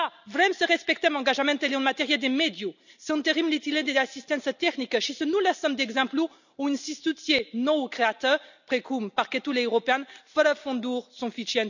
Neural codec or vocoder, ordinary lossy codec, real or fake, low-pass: none; none; real; 7.2 kHz